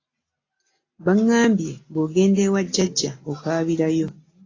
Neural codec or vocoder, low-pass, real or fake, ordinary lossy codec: none; 7.2 kHz; real; AAC, 32 kbps